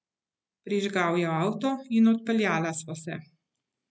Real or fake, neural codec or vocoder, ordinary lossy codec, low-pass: real; none; none; none